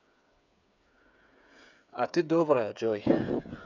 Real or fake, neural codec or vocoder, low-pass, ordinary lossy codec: fake; codec, 16 kHz, 8 kbps, FreqCodec, smaller model; 7.2 kHz; none